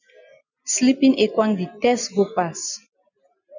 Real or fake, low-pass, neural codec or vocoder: real; 7.2 kHz; none